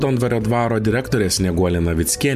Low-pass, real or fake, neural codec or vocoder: 14.4 kHz; real; none